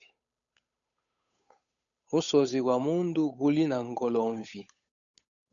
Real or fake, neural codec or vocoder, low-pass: fake; codec, 16 kHz, 8 kbps, FunCodec, trained on Chinese and English, 25 frames a second; 7.2 kHz